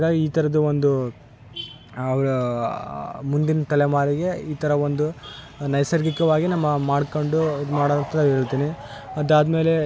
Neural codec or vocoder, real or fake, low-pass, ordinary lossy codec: none; real; none; none